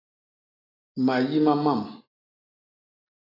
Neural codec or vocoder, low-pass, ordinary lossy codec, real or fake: none; 5.4 kHz; AAC, 24 kbps; real